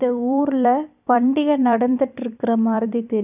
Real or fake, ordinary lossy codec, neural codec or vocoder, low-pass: fake; none; vocoder, 44.1 kHz, 128 mel bands every 256 samples, BigVGAN v2; 3.6 kHz